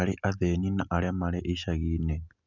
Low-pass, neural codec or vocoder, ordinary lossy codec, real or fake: 7.2 kHz; none; none; real